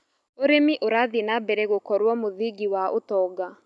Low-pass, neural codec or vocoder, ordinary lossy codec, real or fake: none; none; none; real